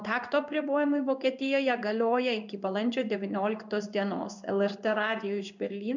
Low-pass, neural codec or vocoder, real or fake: 7.2 kHz; codec, 16 kHz in and 24 kHz out, 1 kbps, XY-Tokenizer; fake